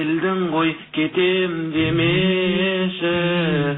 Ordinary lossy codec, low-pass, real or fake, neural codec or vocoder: AAC, 16 kbps; 7.2 kHz; real; none